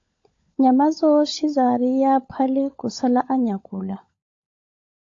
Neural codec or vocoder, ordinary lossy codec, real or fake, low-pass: codec, 16 kHz, 16 kbps, FunCodec, trained on LibriTTS, 50 frames a second; AAC, 48 kbps; fake; 7.2 kHz